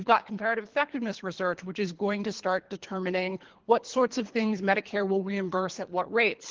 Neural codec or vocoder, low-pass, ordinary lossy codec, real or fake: codec, 24 kHz, 3 kbps, HILCodec; 7.2 kHz; Opus, 16 kbps; fake